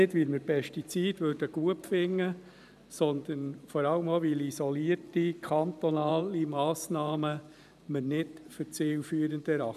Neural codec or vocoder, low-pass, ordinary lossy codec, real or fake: vocoder, 44.1 kHz, 128 mel bands every 512 samples, BigVGAN v2; 14.4 kHz; none; fake